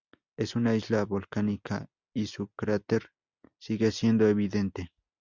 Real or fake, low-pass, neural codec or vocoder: real; 7.2 kHz; none